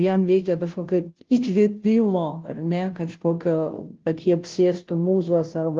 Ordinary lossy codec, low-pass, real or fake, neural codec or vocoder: Opus, 16 kbps; 7.2 kHz; fake; codec, 16 kHz, 0.5 kbps, FunCodec, trained on Chinese and English, 25 frames a second